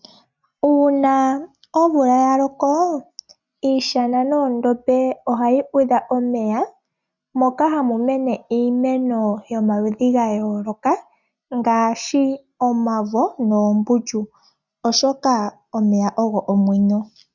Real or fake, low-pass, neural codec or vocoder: real; 7.2 kHz; none